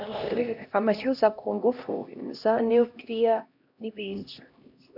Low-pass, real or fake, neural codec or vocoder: 5.4 kHz; fake; codec, 16 kHz, 1 kbps, X-Codec, HuBERT features, trained on LibriSpeech